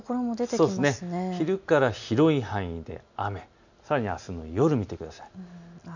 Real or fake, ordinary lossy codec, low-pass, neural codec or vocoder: real; none; 7.2 kHz; none